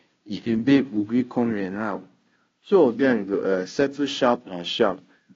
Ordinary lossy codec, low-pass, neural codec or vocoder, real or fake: AAC, 32 kbps; 7.2 kHz; codec, 16 kHz, 0.5 kbps, FunCodec, trained on Chinese and English, 25 frames a second; fake